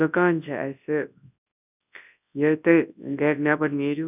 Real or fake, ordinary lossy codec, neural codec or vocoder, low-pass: fake; none; codec, 24 kHz, 0.9 kbps, WavTokenizer, large speech release; 3.6 kHz